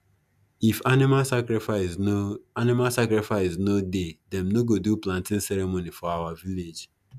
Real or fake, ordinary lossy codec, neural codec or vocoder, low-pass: real; none; none; 14.4 kHz